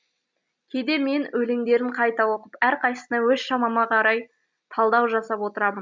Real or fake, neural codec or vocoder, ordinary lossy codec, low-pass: real; none; none; none